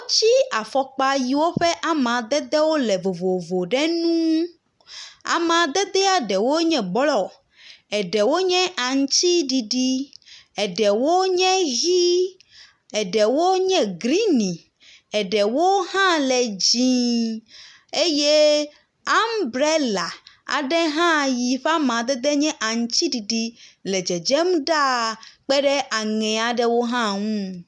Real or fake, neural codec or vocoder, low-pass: real; none; 10.8 kHz